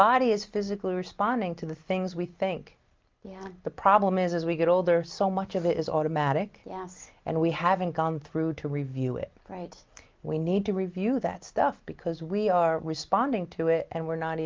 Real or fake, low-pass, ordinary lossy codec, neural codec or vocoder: real; 7.2 kHz; Opus, 32 kbps; none